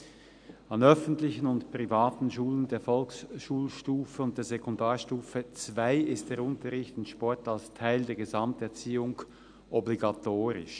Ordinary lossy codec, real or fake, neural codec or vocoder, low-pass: none; real; none; 9.9 kHz